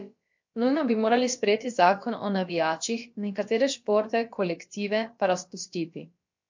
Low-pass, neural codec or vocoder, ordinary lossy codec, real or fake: 7.2 kHz; codec, 16 kHz, about 1 kbps, DyCAST, with the encoder's durations; MP3, 48 kbps; fake